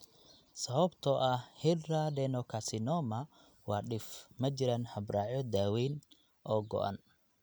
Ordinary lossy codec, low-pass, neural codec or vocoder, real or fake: none; none; none; real